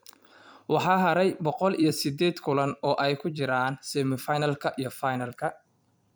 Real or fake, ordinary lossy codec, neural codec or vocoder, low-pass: fake; none; vocoder, 44.1 kHz, 128 mel bands every 256 samples, BigVGAN v2; none